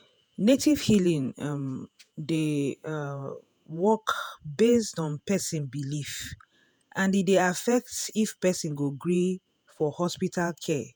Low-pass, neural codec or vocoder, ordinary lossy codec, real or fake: none; vocoder, 48 kHz, 128 mel bands, Vocos; none; fake